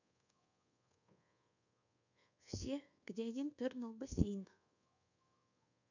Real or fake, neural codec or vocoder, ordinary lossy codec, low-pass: fake; codec, 24 kHz, 1.2 kbps, DualCodec; none; 7.2 kHz